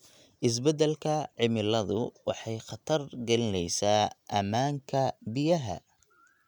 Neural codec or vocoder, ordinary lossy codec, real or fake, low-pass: none; none; real; 19.8 kHz